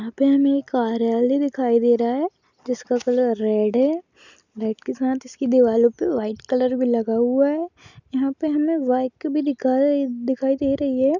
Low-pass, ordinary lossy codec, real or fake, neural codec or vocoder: 7.2 kHz; none; real; none